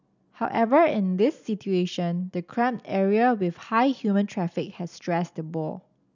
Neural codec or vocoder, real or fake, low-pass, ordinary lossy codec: none; real; 7.2 kHz; none